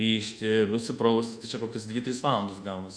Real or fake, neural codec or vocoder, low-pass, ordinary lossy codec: fake; codec, 24 kHz, 1.2 kbps, DualCodec; 9.9 kHz; Opus, 64 kbps